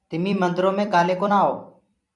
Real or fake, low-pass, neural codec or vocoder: fake; 10.8 kHz; vocoder, 44.1 kHz, 128 mel bands every 256 samples, BigVGAN v2